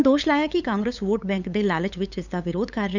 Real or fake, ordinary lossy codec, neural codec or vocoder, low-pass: fake; none; codec, 16 kHz, 8 kbps, FunCodec, trained on LibriTTS, 25 frames a second; 7.2 kHz